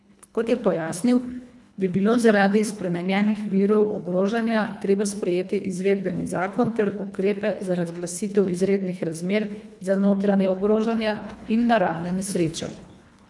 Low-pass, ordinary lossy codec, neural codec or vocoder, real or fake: none; none; codec, 24 kHz, 1.5 kbps, HILCodec; fake